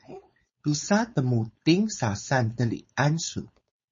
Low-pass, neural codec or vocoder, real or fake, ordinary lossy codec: 7.2 kHz; codec, 16 kHz, 4.8 kbps, FACodec; fake; MP3, 32 kbps